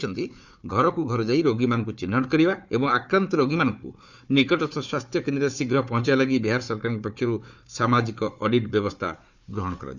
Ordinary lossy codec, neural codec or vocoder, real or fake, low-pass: none; codec, 16 kHz, 4 kbps, FunCodec, trained on Chinese and English, 50 frames a second; fake; 7.2 kHz